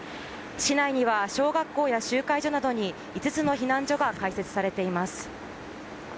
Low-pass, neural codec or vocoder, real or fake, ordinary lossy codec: none; none; real; none